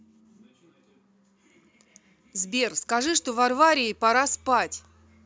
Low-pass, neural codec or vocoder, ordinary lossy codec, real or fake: none; none; none; real